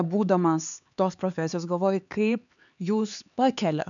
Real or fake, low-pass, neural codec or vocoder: fake; 7.2 kHz; codec, 16 kHz, 4 kbps, X-Codec, HuBERT features, trained on LibriSpeech